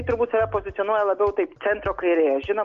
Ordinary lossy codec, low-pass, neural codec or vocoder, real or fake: Opus, 32 kbps; 7.2 kHz; none; real